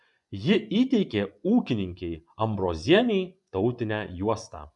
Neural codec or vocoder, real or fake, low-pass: vocoder, 24 kHz, 100 mel bands, Vocos; fake; 10.8 kHz